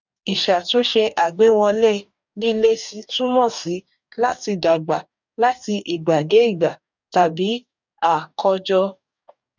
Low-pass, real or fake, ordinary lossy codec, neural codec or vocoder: 7.2 kHz; fake; none; codec, 44.1 kHz, 2.6 kbps, DAC